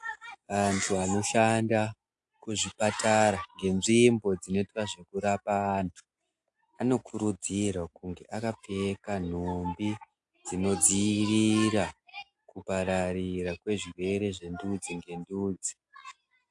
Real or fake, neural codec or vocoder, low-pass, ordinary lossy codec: real; none; 10.8 kHz; AAC, 64 kbps